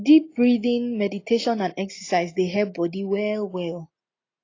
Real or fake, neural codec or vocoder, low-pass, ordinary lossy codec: real; none; 7.2 kHz; AAC, 32 kbps